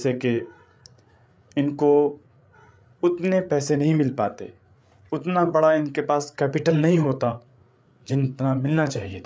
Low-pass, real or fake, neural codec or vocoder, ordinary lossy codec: none; fake; codec, 16 kHz, 8 kbps, FreqCodec, larger model; none